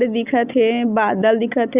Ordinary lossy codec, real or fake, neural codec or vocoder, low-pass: Opus, 64 kbps; real; none; 3.6 kHz